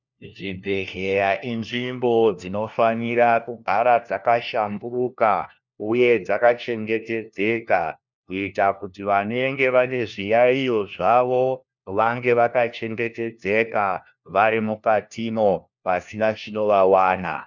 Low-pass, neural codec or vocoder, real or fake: 7.2 kHz; codec, 16 kHz, 1 kbps, FunCodec, trained on LibriTTS, 50 frames a second; fake